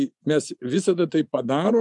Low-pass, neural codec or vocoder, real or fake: 10.8 kHz; vocoder, 24 kHz, 100 mel bands, Vocos; fake